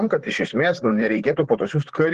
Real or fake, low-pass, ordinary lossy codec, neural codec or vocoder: fake; 14.4 kHz; Opus, 16 kbps; vocoder, 44.1 kHz, 128 mel bands, Pupu-Vocoder